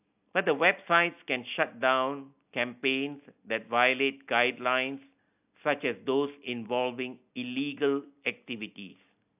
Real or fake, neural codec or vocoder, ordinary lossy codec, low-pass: real; none; AAC, 32 kbps; 3.6 kHz